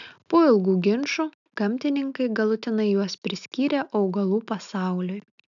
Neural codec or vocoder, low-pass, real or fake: none; 7.2 kHz; real